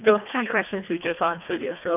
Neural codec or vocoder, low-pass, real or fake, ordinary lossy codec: codec, 24 kHz, 1.5 kbps, HILCodec; 3.6 kHz; fake; AAC, 32 kbps